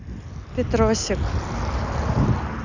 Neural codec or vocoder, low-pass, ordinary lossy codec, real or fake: none; 7.2 kHz; none; real